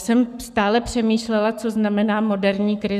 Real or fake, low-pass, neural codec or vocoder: fake; 14.4 kHz; codec, 44.1 kHz, 7.8 kbps, Pupu-Codec